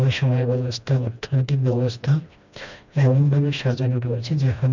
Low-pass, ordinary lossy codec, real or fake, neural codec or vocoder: 7.2 kHz; none; fake; codec, 16 kHz, 1 kbps, FreqCodec, smaller model